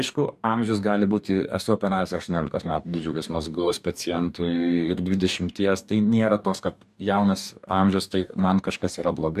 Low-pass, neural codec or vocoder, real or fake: 14.4 kHz; codec, 44.1 kHz, 2.6 kbps, DAC; fake